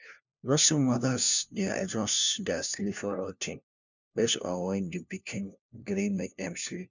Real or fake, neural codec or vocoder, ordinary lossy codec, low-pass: fake; codec, 16 kHz, 1 kbps, FunCodec, trained on LibriTTS, 50 frames a second; none; 7.2 kHz